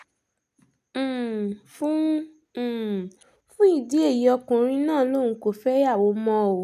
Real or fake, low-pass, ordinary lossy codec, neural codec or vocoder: real; 14.4 kHz; none; none